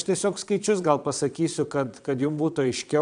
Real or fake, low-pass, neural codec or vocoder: fake; 9.9 kHz; vocoder, 22.05 kHz, 80 mel bands, Vocos